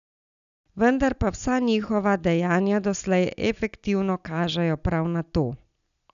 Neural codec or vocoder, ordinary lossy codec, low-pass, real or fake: none; none; 7.2 kHz; real